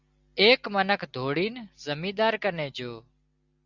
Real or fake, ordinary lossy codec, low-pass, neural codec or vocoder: real; Opus, 64 kbps; 7.2 kHz; none